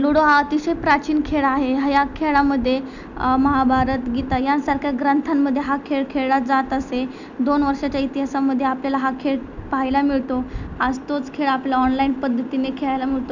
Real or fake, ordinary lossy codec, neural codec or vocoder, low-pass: real; none; none; 7.2 kHz